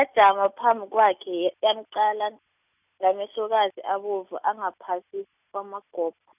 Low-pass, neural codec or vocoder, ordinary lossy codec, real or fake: 3.6 kHz; none; none; real